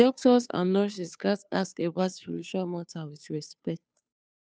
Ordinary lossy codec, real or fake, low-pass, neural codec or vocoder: none; fake; none; codec, 16 kHz, 2 kbps, FunCodec, trained on Chinese and English, 25 frames a second